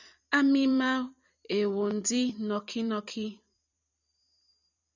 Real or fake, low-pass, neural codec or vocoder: fake; 7.2 kHz; vocoder, 44.1 kHz, 128 mel bands every 256 samples, BigVGAN v2